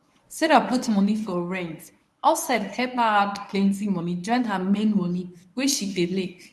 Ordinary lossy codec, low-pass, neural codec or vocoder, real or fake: none; none; codec, 24 kHz, 0.9 kbps, WavTokenizer, medium speech release version 1; fake